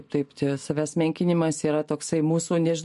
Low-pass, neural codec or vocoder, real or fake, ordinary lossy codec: 14.4 kHz; none; real; MP3, 48 kbps